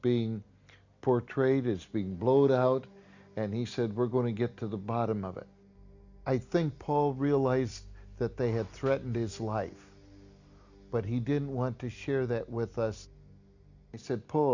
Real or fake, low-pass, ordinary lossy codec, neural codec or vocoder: real; 7.2 kHz; Opus, 64 kbps; none